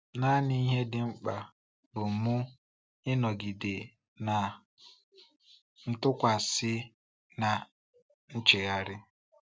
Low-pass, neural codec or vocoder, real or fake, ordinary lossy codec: none; none; real; none